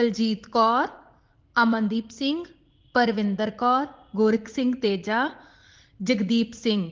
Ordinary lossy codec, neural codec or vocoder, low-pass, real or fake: Opus, 16 kbps; vocoder, 44.1 kHz, 80 mel bands, Vocos; 7.2 kHz; fake